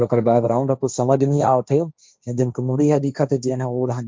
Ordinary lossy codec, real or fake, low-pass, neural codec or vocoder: none; fake; none; codec, 16 kHz, 1.1 kbps, Voila-Tokenizer